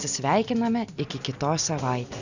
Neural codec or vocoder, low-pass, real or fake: none; 7.2 kHz; real